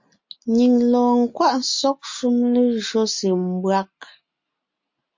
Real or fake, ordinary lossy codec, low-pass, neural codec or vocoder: real; MP3, 48 kbps; 7.2 kHz; none